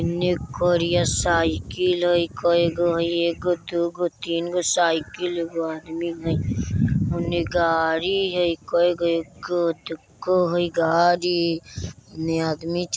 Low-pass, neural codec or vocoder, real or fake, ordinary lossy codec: none; none; real; none